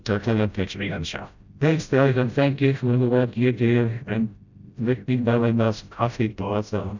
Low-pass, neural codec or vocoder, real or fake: 7.2 kHz; codec, 16 kHz, 0.5 kbps, FreqCodec, smaller model; fake